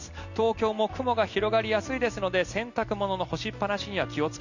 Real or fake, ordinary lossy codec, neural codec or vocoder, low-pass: real; none; none; 7.2 kHz